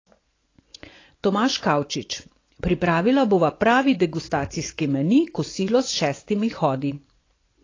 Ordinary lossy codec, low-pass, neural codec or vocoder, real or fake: AAC, 32 kbps; 7.2 kHz; vocoder, 24 kHz, 100 mel bands, Vocos; fake